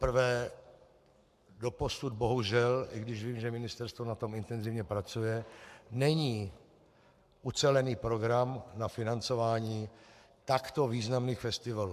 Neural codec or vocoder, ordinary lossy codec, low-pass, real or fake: codec, 44.1 kHz, 7.8 kbps, Pupu-Codec; AAC, 96 kbps; 14.4 kHz; fake